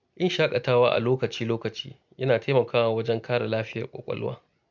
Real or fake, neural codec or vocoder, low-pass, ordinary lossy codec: real; none; 7.2 kHz; none